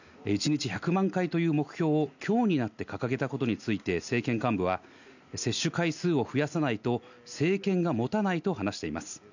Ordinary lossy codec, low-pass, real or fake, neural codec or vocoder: none; 7.2 kHz; real; none